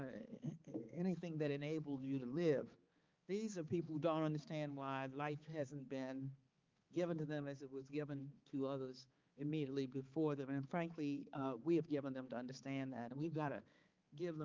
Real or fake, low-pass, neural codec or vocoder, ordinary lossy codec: fake; 7.2 kHz; codec, 16 kHz, 4 kbps, X-Codec, HuBERT features, trained on balanced general audio; Opus, 24 kbps